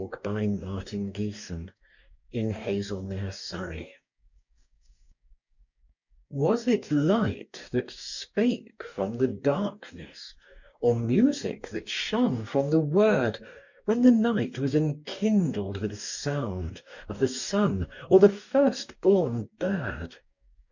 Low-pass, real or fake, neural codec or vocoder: 7.2 kHz; fake; codec, 44.1 kHz, 2.6 kbps, DAC